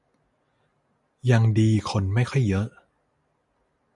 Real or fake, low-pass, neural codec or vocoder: real; 10.8 kHz; none